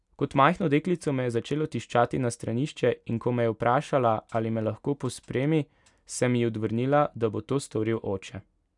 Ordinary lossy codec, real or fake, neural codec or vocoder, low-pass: none; real; none; 10.8 kHz